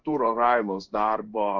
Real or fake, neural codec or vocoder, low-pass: fake; codec, 16 kHz in and 24 kHz out, 1 kbps, XY-Tokenizer; 7.2 kHz